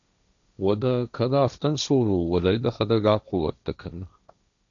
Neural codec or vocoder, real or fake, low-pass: codec, 16 kHz, 1.1 kbps, Voila-Tokenizer; fake; 7.2 kHz